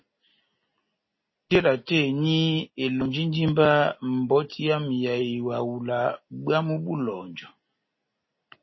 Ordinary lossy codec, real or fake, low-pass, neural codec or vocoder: MP3, 24 kbps; real; 7.2 kHz; none